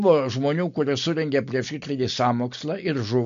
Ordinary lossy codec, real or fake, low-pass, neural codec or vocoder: MP3, 48 kbps; real; 7.2 kHz; none